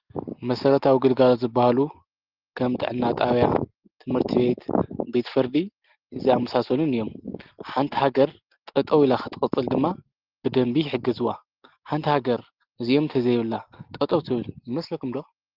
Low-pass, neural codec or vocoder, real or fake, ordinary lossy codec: 5.4 kHz; none; real; Opus, 16 kbps